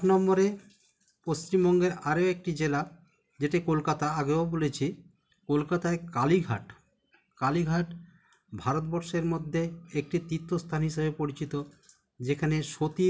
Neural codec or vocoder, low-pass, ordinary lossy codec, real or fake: none; none; none; real